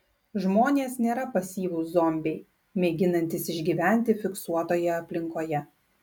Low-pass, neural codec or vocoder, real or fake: 19.8 kHz; none; real